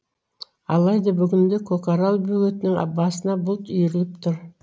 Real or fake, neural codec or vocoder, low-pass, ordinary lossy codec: real; none; none; none